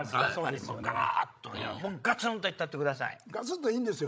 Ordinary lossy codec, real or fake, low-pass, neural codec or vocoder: none; fake; none; codec, 16 kHz, 16 kbps, FreqCodec, larger model